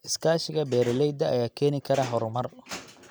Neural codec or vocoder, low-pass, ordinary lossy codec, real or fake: none; none; none; real